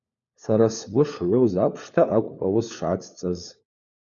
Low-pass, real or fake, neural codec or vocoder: 7.2 kHz; fake; codec, 16 kHz, 4 kbps, FunCodec, trained on LibriTTS, 50 frames a second